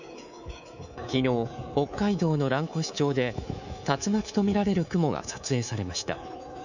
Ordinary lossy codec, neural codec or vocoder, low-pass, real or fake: none; codec, 24 kHz, 3.1 kbps, DualCodec; 7.2 kHz; fake